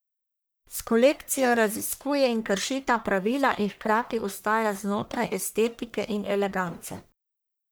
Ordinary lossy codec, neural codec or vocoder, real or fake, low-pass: none; codec, 44.1 kHz, 1.7 kbps, Pupu-Codec; fake; none